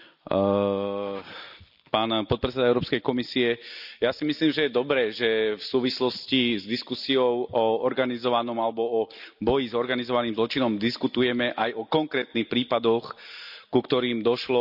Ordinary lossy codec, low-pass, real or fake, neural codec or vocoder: none; 5.4 kHz; real; none